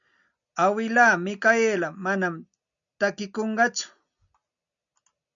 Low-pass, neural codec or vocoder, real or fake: 7.2 kHz; none; real